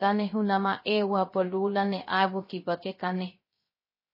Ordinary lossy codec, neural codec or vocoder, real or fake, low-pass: MP3, 24 kbps; codec, 16 kHz, 0.3 kbps, FocalCodec; fake; 5.4 kHz